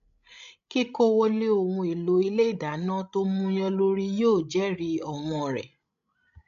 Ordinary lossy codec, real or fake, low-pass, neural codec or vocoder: AAC, 96 kbps; fake; 7.2 kHz; codec, 16 kHz, 16 kbps, FreqCodec, larger model